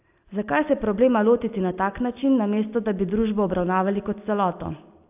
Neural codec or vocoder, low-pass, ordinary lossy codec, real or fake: none; 3.6 kHz; AAC, 32 kbps; real